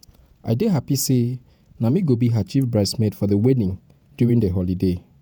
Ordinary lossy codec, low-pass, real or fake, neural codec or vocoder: none; none; fake; vocoder, 48 kHz, 128 mel bands, Vocos